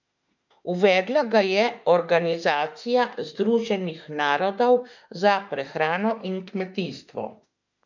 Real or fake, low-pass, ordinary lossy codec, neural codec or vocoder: fake; 7.2 kHz; none; autoencoder, 48 kHz, 32 numbers a frame, DAC-VAE, trained on Japanese speech